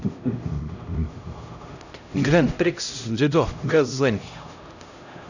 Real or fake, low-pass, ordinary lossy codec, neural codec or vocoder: fake; 7.2 kHz; none; codec, 16 kHz, 0.5 kbps, X-Codec, HuBERT features, trained on LibriSpeech